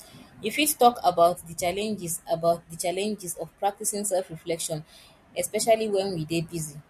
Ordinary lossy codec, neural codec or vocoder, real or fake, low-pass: MP3, 64 kbps; none; real; 14.4 kHz